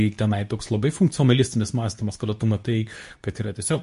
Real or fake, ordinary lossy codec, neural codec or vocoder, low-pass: fake; MP3, 48 kbps; codec, 24 kHz, 0.9 kbps, WavTokenizer, medium speech release version 2; 10.8 kHz